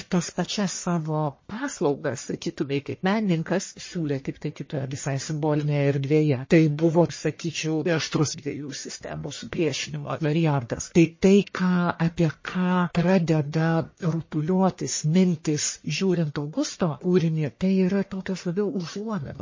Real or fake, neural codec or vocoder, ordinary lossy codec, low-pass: fake; codec, 44.1 kHz, 1.7 kbps, Pupu-Codec; MP3, 32 kbps; 7.2 kHz